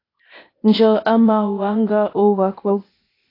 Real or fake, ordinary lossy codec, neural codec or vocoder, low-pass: fake; AAC, 24 kbps; codec, 16 kHz, 0.8 kbps, ZipCodec; 5.4 kHz